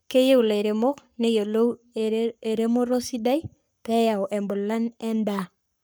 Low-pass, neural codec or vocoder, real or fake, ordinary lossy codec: none; codec, 44.1 kHz, 7.8 kbps, Pupu-Codec; fake; none